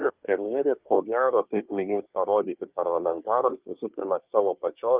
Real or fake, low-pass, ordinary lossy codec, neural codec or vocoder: fake; 3.6 kHz; Opus, 24 kbps; codec, 24 kHz, 1 kbps, SNAC